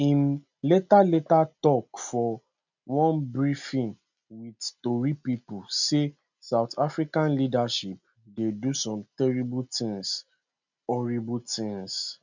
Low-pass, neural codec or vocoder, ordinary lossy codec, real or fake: 7.2 kHz; none; none; real